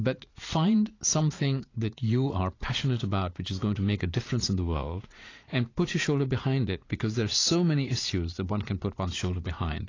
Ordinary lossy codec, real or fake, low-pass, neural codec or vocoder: AAC, 32 kbps; fake; 7.2 kHz; vocoder, 44.1 kHz, 80 mel bands, Vocos